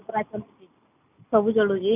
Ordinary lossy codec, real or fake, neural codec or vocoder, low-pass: none; real; none; 3.6 kHz